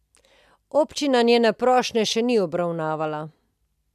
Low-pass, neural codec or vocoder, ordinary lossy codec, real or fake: 14.4 kHz; none; none; real